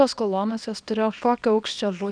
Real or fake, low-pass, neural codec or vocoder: fake; 9.9 kHz; codec, 24 kHz, 0.9 kbps, WavTokenizer, small release